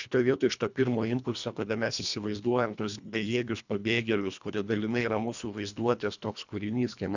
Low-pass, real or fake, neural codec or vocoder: 7.2 kHz; fake; codec, 24 kHz, 1.5 kbps, HILCodec